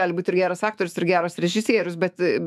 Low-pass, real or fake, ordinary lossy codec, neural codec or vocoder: 14.4 kHz; fake; MP3, 96 kbps; autoencoder, 48 kHz, 128 numbers a frame, DAC-VAE, trained on Japanese speech